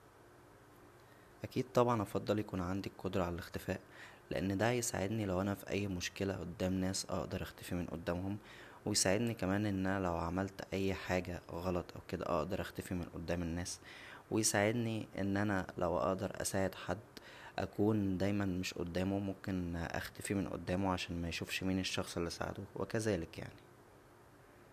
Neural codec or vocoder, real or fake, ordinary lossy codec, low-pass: none; real; none; 14.4 kHz